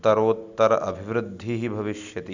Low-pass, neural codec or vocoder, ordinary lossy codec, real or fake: 7.2 kHz; none; Opus, 64 kbps; real